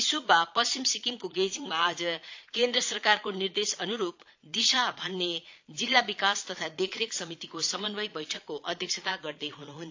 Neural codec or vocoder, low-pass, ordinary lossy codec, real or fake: vocoder, 22.05 kHz, 80 mel bands, Vocos; 7.2 kHz; AAC, 48 kbps; fake